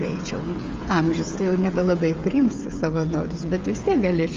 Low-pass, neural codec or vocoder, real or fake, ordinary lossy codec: 7.2 kHz; codec, 16 kHz, 16 kbps, FreqCodec, smaller model; fake; Opus, 32 kbps